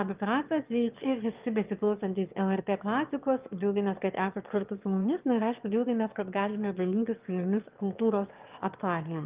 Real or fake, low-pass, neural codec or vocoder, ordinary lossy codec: fake; 3.6 kHz; autoencoder, 22.05 kHz, a latent of 192 numbers a frame, VITS, trained on one speaker; Opus, 32 kbps